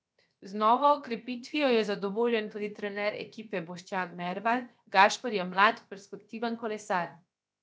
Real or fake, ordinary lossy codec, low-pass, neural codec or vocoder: fake; none; none; codec, 16 kHz, 0.7 kbps, FocalCodec